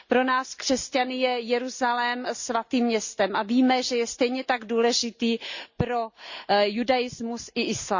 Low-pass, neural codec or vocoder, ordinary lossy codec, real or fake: 7.2 kHz; none; Opus, 64 kbps; real